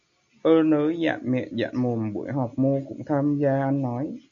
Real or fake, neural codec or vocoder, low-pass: real; none; 7.2 kHz